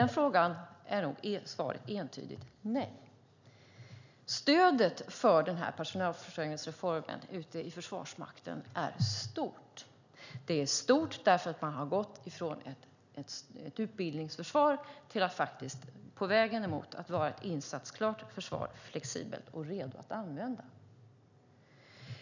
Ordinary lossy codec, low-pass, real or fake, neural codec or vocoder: none; 7.2 kHz; real; none